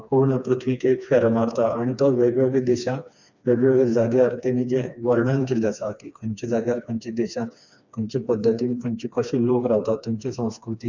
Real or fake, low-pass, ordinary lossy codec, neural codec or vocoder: fake; 7.2 kHz; none; codec, 16 kHz, 2 kbps, FreqCodec, smaller model